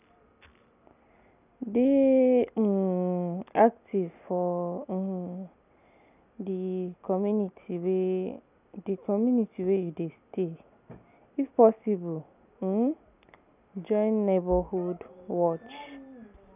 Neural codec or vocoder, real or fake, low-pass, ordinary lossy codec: none; real; 3.6 kHz; none